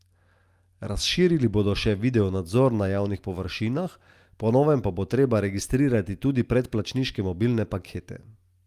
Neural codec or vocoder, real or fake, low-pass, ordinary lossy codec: none; real; 14.4 kHz; Opus, 32 kbps